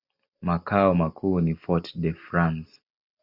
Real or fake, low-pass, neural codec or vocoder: real; 5.4 kHz; none